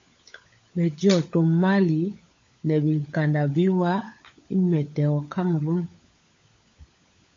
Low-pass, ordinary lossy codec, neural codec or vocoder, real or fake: 7.2 kHz; AAC, 48 kbps; codec, 16 kHz, 16 kbps, FunCodec, trained on LibriTTS, 50 frames a second; fake